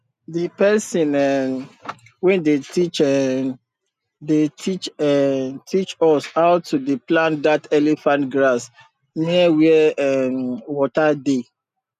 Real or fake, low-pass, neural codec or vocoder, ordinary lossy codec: real; 14.4 kHz; none; none